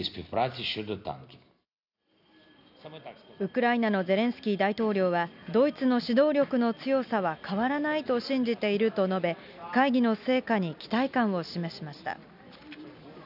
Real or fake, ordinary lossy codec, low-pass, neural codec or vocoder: real; none; 5.4 kHz; none